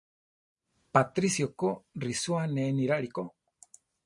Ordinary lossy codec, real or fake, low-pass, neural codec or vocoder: MP3, 48 kbps; real; 10.8 kHz; none